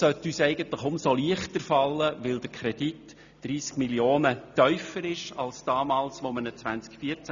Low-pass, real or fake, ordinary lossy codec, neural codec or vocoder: 7.2 kHz; real; none; none